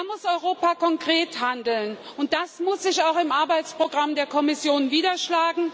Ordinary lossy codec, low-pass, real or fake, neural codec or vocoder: none; none; real; none